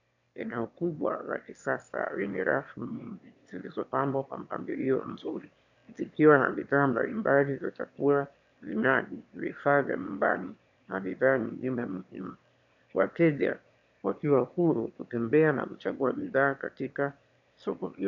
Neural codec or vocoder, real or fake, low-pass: autoencoder, 22.05 kHz, a latent of 192 numbers a frame, VITS, trained on one speaker; fake; 7.2 kHz